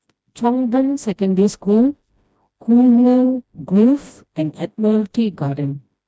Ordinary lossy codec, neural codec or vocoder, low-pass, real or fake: none; codec, 16 kHz, 1 kbps, FreqCodec, smaller model; none; fake